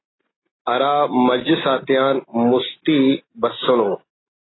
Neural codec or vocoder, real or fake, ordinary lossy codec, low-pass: none; real; AAC, 16 kbps; 7.2 kHz